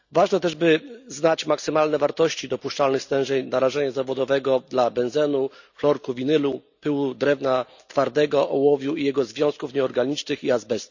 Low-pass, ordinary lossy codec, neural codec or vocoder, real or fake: 7.2 kHz; none; none; real